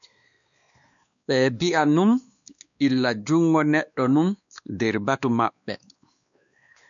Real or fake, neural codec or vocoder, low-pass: fake; codec, 16 kHz, 2 kbps, X-Codec, WavLM features, trained on Multilingual LibriSpeech; 7.2 kHz